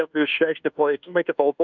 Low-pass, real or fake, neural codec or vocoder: 7.2 kHz; fake; codec, 24 kHz, 1.2 kbps, DualCodec